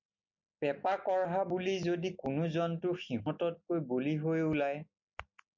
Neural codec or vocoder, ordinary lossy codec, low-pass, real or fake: none; MP3, 48 kbps; 7.2 kHz; real